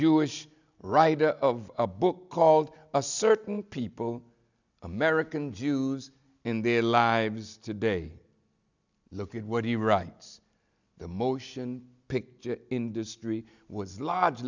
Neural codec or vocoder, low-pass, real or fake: none; 7.2 kHz; real